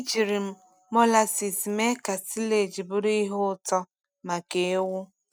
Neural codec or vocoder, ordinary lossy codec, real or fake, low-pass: none; none; real; none